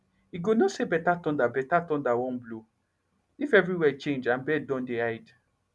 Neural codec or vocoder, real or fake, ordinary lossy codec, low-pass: none; real; none; none